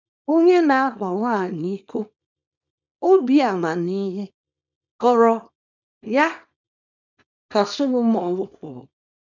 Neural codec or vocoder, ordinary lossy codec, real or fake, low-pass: codec, 24 kHz, 0.9 kbps, WavTokenizer, small release; none; fake; 7.2 kHz